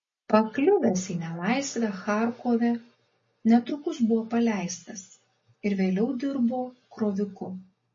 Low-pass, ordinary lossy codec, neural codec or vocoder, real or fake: 7.2 kHz; MP3, 32 kbps; none; real